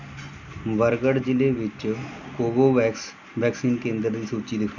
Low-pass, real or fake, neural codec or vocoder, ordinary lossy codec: 7.2 kHz; real; none; none